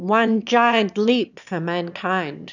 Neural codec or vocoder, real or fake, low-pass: autoencoder, 22.05 kHz, a latent of 192 numbers a frame, VITS, trained on one speaker; fake; 7.2 kHz